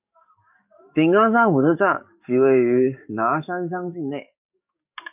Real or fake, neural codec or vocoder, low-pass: fake; codec, 44.1 kHz, 7.8 kbps, DAC; 3.6 kHz